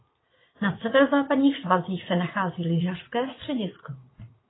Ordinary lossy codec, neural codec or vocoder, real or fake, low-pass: AAC, 16 kbps; vocoder, 44.1 kHz, 80 mel bands, Vocos; fake; 7.2 kHz